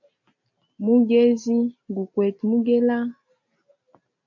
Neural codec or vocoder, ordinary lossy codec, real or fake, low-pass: none; MP3, 48 kbps; real; 7.2 kHz